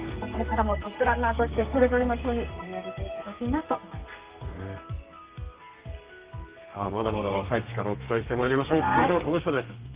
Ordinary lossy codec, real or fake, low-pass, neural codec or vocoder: Opus, 32 kbps; fake; 3.6 kHz; codec, 32 kHz, 1.9 kbps, SNAC